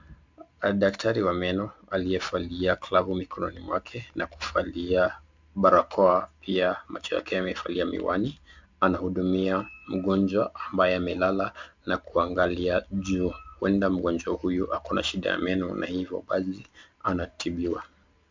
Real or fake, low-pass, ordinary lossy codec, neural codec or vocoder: real; 7.2 kHz; MP3, 64 kbps; none